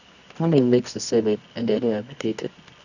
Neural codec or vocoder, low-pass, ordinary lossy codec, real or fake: codec, 24 kHz, 0.9 kbps, WavTokenizer, medium music audio release; 7.2 kHz; none; fake